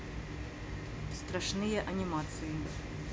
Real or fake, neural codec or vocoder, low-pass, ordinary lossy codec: real; none; none; none